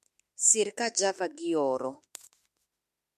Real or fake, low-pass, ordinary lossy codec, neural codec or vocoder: fake; 14.4 kHz; MP3, 64 kbps; autoencoder, 48 kHz, 32 numbers a frame, DAC-VAE, trained on Japanese speech